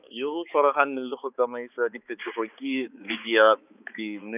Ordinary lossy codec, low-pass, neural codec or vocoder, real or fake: none; 3.6 kHz; codec, 16 kHz, 4 kbps, X-Codec, HuBERT features, trained on balanced general audio; fake